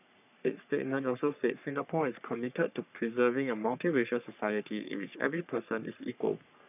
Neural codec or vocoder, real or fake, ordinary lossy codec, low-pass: codec, 44.1 kHz, 3.4 kbps, Pupu-Codec; fake; none; 3.6 kHz